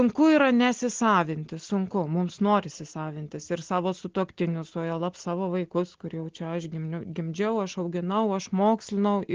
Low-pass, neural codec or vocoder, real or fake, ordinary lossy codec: 7.2 kHz; none; real; Opus, 16 kbps